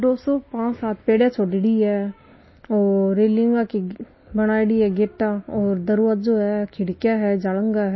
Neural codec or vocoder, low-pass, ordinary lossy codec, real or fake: none; 7.2 kHz; MP3, 24 kbps; real